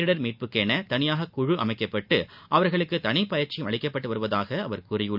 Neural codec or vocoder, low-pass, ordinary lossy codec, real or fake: none; 5.4 kHz; none; real